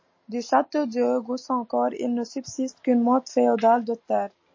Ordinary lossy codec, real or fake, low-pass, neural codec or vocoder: MP3, 32 kbps; real; 7.2 kHz; none